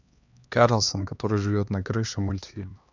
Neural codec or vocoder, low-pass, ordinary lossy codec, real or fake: codec, 16 kHz, 2 kbps, X-Codec, HuBERT features, trained on LibriSpeech; 7.2 kHz; none; fake